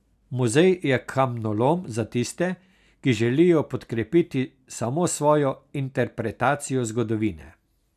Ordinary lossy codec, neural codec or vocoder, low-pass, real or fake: none; none; 14.4 kHz; real